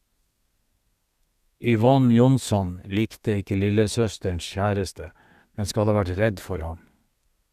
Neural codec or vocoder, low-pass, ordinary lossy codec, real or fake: codec, 32 kHz, 1.9 kbps, SNAC; 14.4 kHz; none; fake